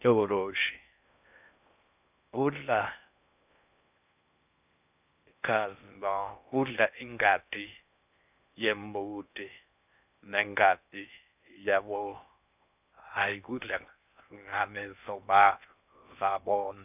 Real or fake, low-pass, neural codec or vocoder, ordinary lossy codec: fake; 3.6 kHz; codec, 16 kHz in and 24 kHz out, 0.6 kbps, FocalCodec, streaming, 4096 codes; none